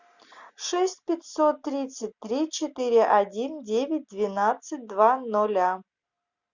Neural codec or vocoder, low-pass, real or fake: none; 7.2 kHz; real